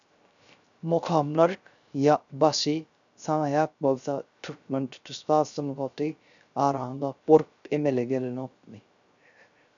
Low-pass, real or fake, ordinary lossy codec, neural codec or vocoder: 7.2 kHz; fake; MP3, 96 kbps; codec, 16 kHz, 0.3 kbps, FocalCodec